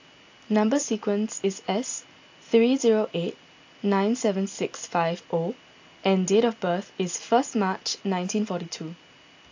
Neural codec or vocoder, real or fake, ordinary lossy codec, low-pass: none; real; AAC, 48 kbps; 7.2 kHz